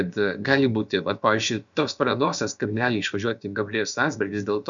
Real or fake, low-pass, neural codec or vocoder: fake; 7.2 kHz; codec, 16 kHz, about 1 kbps, DyCAST, with the encoder's durations